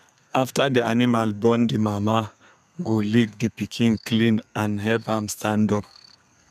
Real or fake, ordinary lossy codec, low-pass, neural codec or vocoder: fake; none; 14.4 kHz; codec, 32 kHz, 1.9 kbps, SNAC